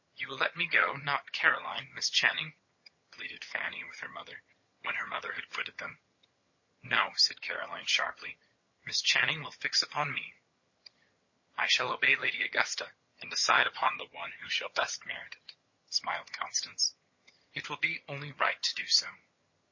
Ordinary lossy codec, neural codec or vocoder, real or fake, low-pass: MP3, 32 kbps; vocoder, 22.05 kHz, 80 mel bands, HiFi-GAN; fake; 7.2 kHz